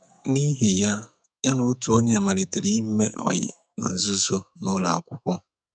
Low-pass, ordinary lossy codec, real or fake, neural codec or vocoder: 9.9 kHz; none; fake; codec, 44.1 kHz, 2.6 kbps, SNAC